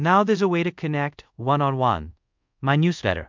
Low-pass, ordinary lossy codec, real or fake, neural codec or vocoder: 7.2 kHz; MP3, 64 kbps; fake; codec, 24 kHz, 0.5 kbps, DualCodec